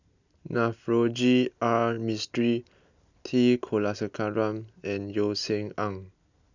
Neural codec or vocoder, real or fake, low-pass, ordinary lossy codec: none; real; 7.2 kHz; none